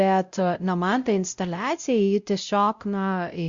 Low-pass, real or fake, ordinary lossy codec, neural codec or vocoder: 7.2 kHz; fake; Opus, 64 kbps; codec, 16 kHz, 0.5 kbps, X-Codec, WavLM features, trained on Multilingual LibriSpeech